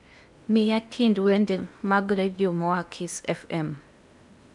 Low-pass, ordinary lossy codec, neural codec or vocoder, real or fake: 10.8 kHz; none; codec, 16 kHz in and 24 kHz out, 0.8 kbps, FocalCodec, streaming, 65536 codes; fake